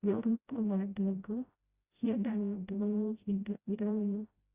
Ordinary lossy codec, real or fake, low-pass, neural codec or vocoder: Opus, 64 kbps; fake; 3.6 kHz; codec, 16 kHz, 0.5 kbps, FreqCodec, smaller model